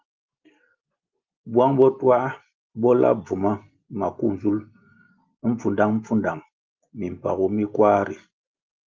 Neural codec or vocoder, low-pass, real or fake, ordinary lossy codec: none; 7.2 kHz; real; Opus, 32 kbps